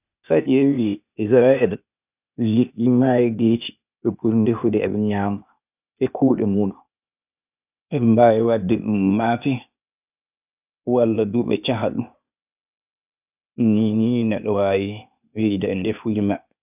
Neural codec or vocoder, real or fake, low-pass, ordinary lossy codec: codec, 16 kHz, 0.8 kbps, ZipCodec; fake; 3.6 kHz; none